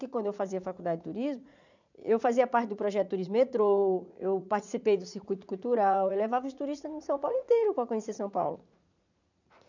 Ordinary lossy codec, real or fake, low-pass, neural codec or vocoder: none; fake; 7.2 kHz; vocoder, 44.1 kHz, 80 mel bands, Vocos